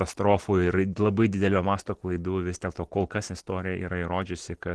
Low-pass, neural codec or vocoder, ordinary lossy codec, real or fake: 10.8 kHz; none; Opus, 16 kbps; real